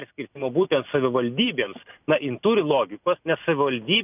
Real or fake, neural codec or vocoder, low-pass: real; none; 3.6 kHz